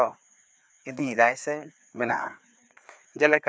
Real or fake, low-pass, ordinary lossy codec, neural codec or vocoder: fake; none; none; codec, 16 kHz, 2 kbps, FreqCodec, larger model